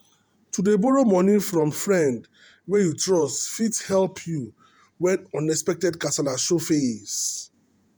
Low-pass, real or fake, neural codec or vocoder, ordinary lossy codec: none; fake; vocoder, 48 kHz, 128 mel bands, Vocos; none